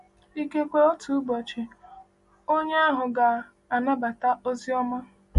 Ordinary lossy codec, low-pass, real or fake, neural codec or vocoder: MP3, 48 kbps; 14.4 kHz; real; none